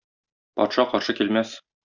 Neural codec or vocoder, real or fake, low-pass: none; real; 7.2 kHz